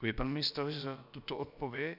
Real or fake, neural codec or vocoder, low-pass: fake; codec, 16 kHz, about 1 kbps, DyCAST, with the encoder's durations; 5.4 kHz